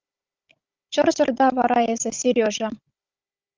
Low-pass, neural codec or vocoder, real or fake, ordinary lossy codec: 7.2 kHz; codec, 16 kHz, 16 kbps, FunCodec, trained on Chinese and English, 50 frames a second; fake; Opus, 24 kbps